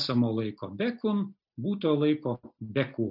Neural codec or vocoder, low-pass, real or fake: none; 5.4 kHz; real